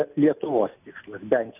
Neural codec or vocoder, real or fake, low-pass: none; real; 3.6 kHz